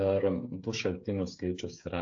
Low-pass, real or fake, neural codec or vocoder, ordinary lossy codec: 7.2 kHz; fake; codec, 16 kHz, 8 kbps, FreqCodec, smaller model; AAC, 48 kbps